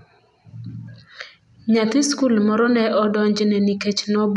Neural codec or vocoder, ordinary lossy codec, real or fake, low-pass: none; none; real; 10.8 kHz